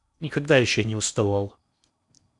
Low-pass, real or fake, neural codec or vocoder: 10.8 kHz; fake; codec, 16 kHz in and 24 kHz out, 0.8 kbps, FocalCodec, streaming, 65536 codes